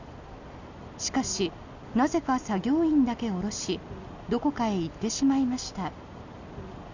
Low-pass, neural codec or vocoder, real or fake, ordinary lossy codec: 7.2 kHz; none; real; none